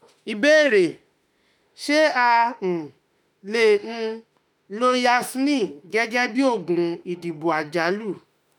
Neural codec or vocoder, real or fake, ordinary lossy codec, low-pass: autoencoder, 48 kHz, 32 numbers a frame, DAC-VAE, trained on Japanese speech; fake; none; 19.8 kHz